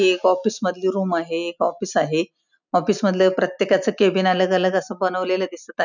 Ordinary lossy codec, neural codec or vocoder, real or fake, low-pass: none; none; real; 7.2 kHz